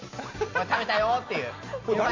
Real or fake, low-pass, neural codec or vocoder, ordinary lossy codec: real; 7.2 kHz; none; MP3, 48 kbps